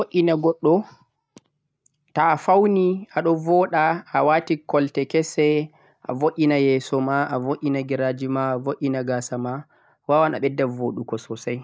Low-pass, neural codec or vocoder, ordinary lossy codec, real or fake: none; none; none; real